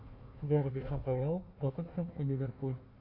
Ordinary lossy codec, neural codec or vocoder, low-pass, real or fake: MP3, 32 kbps; codec, 16 kHz, 1 kbps, FunCodec, trained on Chinese and English, 50 frames a second; 5.4 kHz; fake